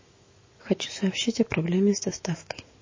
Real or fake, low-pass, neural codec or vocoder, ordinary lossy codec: fake; 7.2 kHz; autoencoder, 48 kHz, 128 numbers a frame, DAC-VAE, trained on Japanese speech; MP3, 32 kbps